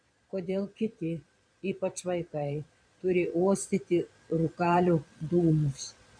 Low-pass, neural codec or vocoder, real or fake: 9.9 kHz; vocoder, 22.05 kHz, 80 mel bands, Vocos; fake